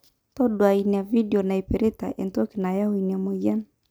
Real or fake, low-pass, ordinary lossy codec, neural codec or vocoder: real; none; none; none